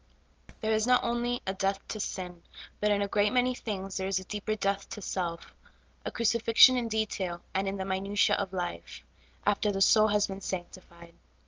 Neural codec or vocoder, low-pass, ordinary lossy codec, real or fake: none; 7.2 kHz; Opus, 24 kbps; real